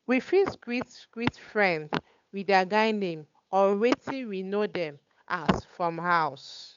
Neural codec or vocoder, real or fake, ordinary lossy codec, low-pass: codec, 16 kHz, 8 kbps, FunCodec, trained on Chinese and English, 25 frames a second; fake; MP3, 64 kbps; 7.2 kHz